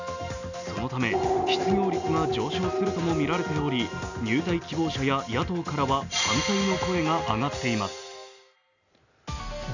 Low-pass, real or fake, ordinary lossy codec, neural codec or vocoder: 7.2 kHz; real; none; none